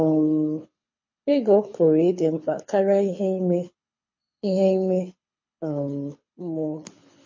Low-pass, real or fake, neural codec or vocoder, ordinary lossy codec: 7.2 kHz; fake; codec, 24 kHz, 6 kbps, HILCodec; MP3, 32 kbps